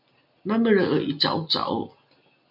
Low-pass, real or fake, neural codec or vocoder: 5.4 kHz; real; none